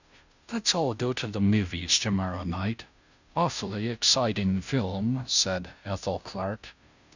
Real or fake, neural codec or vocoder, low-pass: fake; codec, 16 kHz, 0.5 kbps, FunCodec, trained on Chinese and English, 25 frames a second; 7.2 kHz